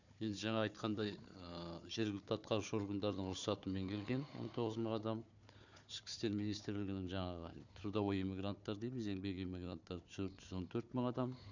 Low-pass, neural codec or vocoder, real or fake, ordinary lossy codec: 7.2 kHz; codec, 16 kHz, 4 kbps, FunCodec, trained on Chinese and English, 50 frames a second; fake; none